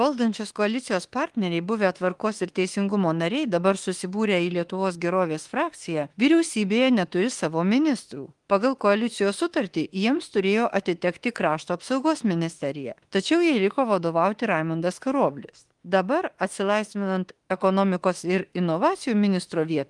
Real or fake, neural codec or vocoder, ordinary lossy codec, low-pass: fake; autoencoder, 48 kHz, 32 numbers a frame, DAC-VAE, trained on Japanese speech; Opus, 24 kbps; 10.8 kHz